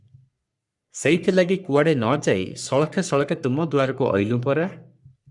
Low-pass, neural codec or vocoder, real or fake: 10.8 kHz; codec, 44.1 kHz, 3.4 kbps, Pupu-Codec; fake